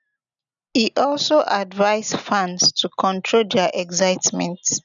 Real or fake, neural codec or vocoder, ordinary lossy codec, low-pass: real; none; none; 7.2 kHz